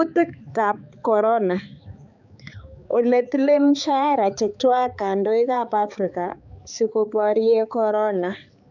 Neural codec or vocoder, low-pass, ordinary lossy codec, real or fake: codec, 16 kHz, 4 kbps, X-Codec, HuBERT features, trained on balanced general audio; 7.2 kHz; none; fake